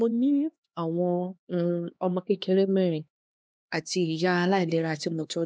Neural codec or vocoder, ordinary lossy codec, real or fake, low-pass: codec, 16 kHz, 2 kbps, X-Codec, HuBERT features, trained on LibriSpeech; none; fake; none